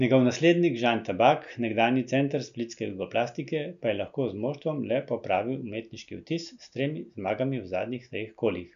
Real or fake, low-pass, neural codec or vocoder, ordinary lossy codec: real; 7.2 kHz; none; none